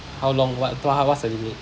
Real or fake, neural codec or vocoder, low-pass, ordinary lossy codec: real; none; none; none